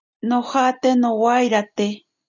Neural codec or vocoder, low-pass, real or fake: none; 7.2 kHz; real